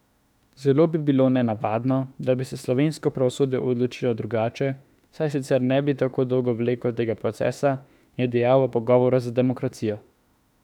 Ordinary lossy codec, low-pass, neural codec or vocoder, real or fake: none; 19.8 kHz; autoencoder, 48 kHz, 32 numbers a frame, DAC-VAE, trained on Japanese speech; fake